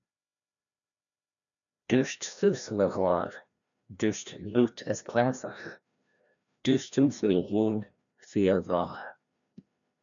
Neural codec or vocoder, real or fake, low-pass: codec, 16 kHz, 1 kbps, FreqCodec, larger model; fake; 7.2 kHz